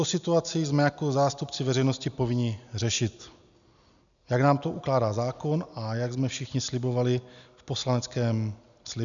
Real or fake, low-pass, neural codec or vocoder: real; 7.2 kHz; none